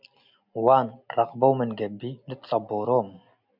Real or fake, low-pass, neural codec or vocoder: real; 5.4 kHz; none